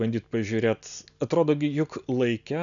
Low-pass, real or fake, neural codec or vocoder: 7.2 kHz; real; none